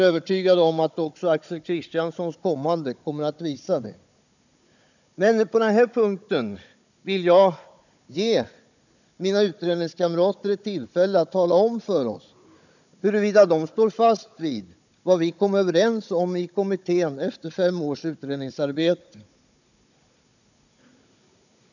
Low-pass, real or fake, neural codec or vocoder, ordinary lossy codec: 7.2 kHz; fake; codec, 16 kHz, 16 kbps, FunCodec, trained on Chinese and English, 50 frames a second; none